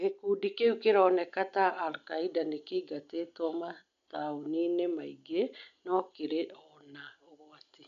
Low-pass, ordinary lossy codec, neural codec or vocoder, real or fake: 7.2 kHz; none; none; real